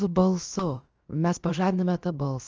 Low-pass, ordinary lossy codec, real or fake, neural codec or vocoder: 7.2 kHz; Opus, 24 kbps; fake; codec, 16 kHz, about 1 kbps, DyCAST, with the encoder's durations